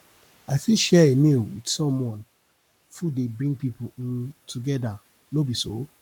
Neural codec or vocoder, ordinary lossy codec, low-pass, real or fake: codec, 44.1 kHz, 7.8 kbps, Pupu-Codec; none; 19.8 kHz; fake